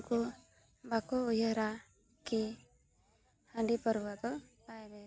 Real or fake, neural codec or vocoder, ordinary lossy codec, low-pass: real; none; none; none